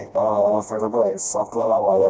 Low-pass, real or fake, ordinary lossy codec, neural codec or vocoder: none; fake; none; codec, 16 kHz, 1 kbps, FreqCodec, smaller model